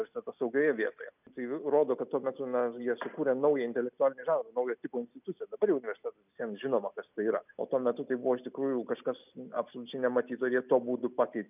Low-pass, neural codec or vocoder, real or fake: 3.6 kHz; none; real